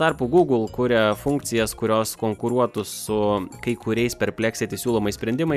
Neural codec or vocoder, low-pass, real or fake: none; 14.4 kHz; real